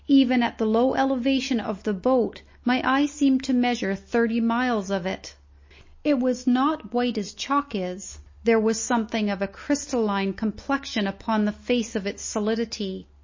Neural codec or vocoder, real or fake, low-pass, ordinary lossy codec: none; real; 7.2 kHz; MP3, 32 kbps